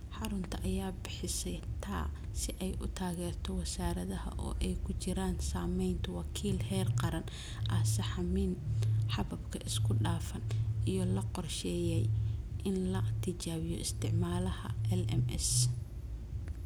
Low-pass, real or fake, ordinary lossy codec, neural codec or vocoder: none; real; none; none